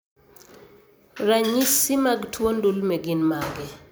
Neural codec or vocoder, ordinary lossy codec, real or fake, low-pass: vocoder, 44.1 kHz, 128 mel bands every 256 samples, BigVGAN v2; none; fake; none